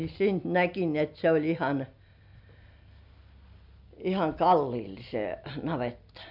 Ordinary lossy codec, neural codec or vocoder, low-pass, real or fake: none; none; 5.4 kHz; real